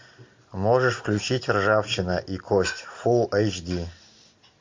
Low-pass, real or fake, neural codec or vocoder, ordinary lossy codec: 7.2 kHz; real; none; MP3, 48 kbps